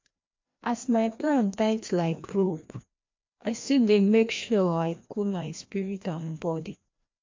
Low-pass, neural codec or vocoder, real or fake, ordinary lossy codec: 7.2 kHz; codec, 16 kHz, 1 kbps, FreqCodec, larger model; fake; MP3, 48 kbps